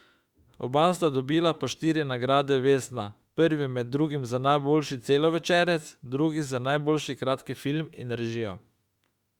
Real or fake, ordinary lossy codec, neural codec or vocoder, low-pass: fake; Opus, 64 kbps; autoencoder, 48 kHz, 32 numbers a frame, DAC-VAE, trained on Japanese speech; 19.8 kHz